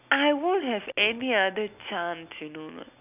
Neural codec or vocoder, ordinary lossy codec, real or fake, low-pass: none; none; real; 3.6 kHz